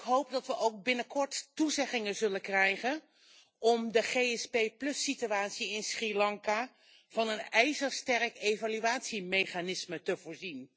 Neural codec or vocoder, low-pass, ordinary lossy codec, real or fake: none; none; none; real